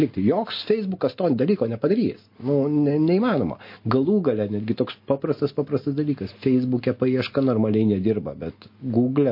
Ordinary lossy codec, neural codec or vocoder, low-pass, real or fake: MP3, 32 kbps; none; 5.4 kHz; real